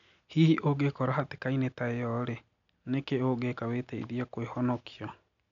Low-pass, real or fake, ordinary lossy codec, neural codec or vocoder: 7.2 kHz; real; none; none